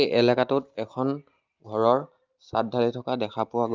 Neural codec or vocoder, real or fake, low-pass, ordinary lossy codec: none; real; 7.2 kHz; Opus, 24 kbps